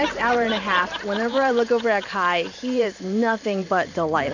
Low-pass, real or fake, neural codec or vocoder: 7.2 kHz; fake; vocoder, 44.1 kHz, 128 mel bands every 512 samples, BigVGAN v2